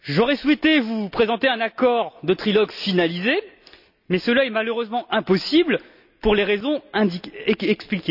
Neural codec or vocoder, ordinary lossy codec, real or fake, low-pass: none; none; real; 5.4 kHz